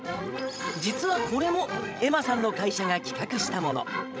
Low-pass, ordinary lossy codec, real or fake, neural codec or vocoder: none; none; fake; codec, 16 kHz, 16 kbps, FreqCodec, larger model